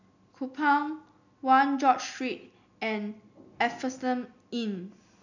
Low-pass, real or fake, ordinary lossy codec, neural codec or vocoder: 7.2 kHz; real; none; none